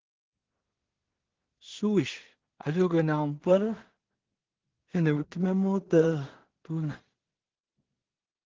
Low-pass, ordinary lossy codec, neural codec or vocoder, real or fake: 7.2 kHz; Opus, 16 kbps; codec, 16 kHz in and 24 kHz out, 0.4 kbps, LongCat-Audio-Codec, two codebook decoder; fake